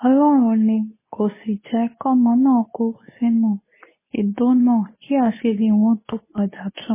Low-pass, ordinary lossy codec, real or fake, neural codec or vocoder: 3.6 kHz; MP3, 16 kbps; fake; codec, 24 kHz, 0.9 kbps, WavTokenizer, medium speech release version 2